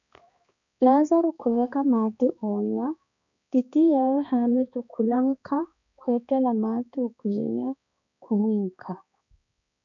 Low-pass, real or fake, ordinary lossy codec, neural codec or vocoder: 7.2 kHz; fake; MP3, 96 kbps; codec, 16 kHz, 2 kbps, X-Codec, HuBERT features, trained on balanced general audio